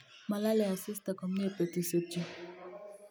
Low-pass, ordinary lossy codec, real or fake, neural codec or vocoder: none; none; real; none